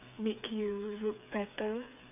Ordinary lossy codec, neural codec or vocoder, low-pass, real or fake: none; codec, 16 kHz, 8 kbps, FreqCodec, smaller model; 3.6 kHz; fake